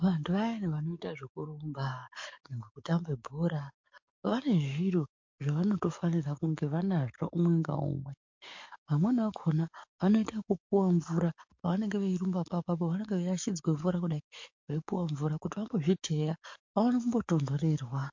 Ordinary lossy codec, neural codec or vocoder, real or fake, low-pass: MP3, 48 kbps; codec, 44.1 kHz, 7.8 kbps, DAC; fake; 7.2 kHz